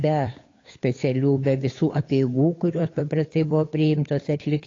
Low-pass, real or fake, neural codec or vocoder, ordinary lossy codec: 7.2 kHz; fake; codec, 16 kHz, 8 kbps, FunCodec, trained on Chinese and English, 25 frames a second; AAC, 32 kbps